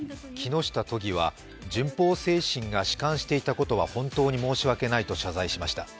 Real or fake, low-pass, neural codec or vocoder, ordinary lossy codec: real; none; none; none